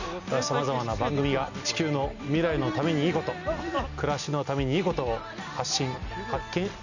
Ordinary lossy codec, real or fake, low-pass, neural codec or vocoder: none; real; 7.2 kHz; none